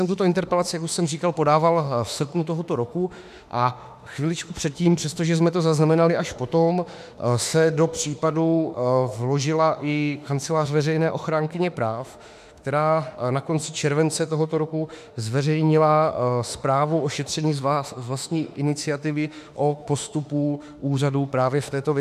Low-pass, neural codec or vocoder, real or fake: 14.4 kHz; autoencoder, 48 kHz, 32 numbers a frame, DAC-VAE, trained on Japanese speech; fake